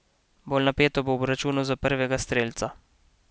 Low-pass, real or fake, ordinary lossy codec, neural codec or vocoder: none; real; none; none